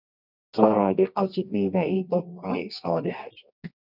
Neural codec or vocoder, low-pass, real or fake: codec, 24 kHz, 0.9 kbps, WavTokenizer, medium music audio release; 5.4 kHz; fake